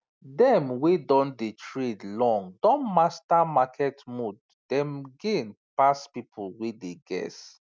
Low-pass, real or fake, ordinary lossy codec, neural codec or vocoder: none; real; none; none